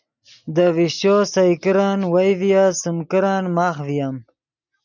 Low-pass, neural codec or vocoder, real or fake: 7.2 kHz; none; real